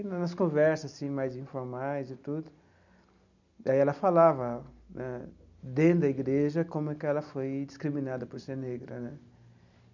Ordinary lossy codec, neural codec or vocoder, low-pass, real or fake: none; none; 7.2 kHz; real